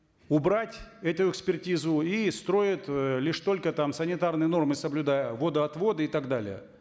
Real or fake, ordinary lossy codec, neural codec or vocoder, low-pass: real; none; none; none